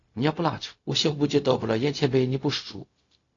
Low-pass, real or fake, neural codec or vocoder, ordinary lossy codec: 7.2 kHz; fake; codec, 16 kHz, 0.4 kbps, LongCat-Audio-Codec; AAC, 32 kbps